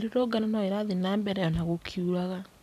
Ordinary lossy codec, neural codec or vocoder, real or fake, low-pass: MP3, 96 kbps; vocoder, 44.1 kHz, 128 mel bands every 256 samples, BigVGAN v2; fake; 14.4 kHz